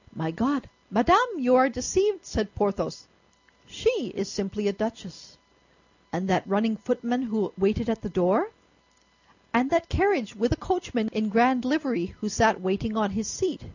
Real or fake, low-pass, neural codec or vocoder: real; 7.2 kHz; none